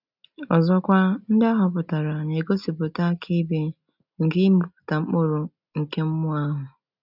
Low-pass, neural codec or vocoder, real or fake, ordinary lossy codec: 5.4 kHz; none; real; none